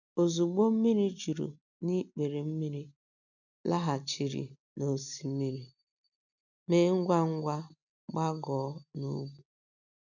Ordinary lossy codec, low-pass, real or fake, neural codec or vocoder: none; 7.2 kHz; real; none